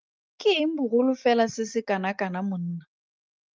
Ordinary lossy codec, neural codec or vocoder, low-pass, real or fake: Opus, 32 kbps; none; 7.2 kHz; real